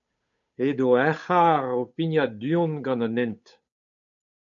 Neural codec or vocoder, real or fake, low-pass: codec, 16 kHz, 8 kbps, FunCodec, trained on Chinese and English, 25 frames a second; fake; 7.2 kHz